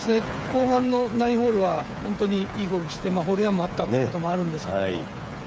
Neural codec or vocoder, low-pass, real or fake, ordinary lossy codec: codec, 16 kHz, 8 kbps, FreqCodec, smaller model; none; fake; none